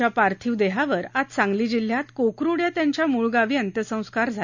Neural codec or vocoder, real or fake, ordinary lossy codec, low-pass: none; real; none; 7.2 kHz